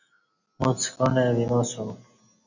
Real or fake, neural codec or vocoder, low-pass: real; none; 7.2 kHz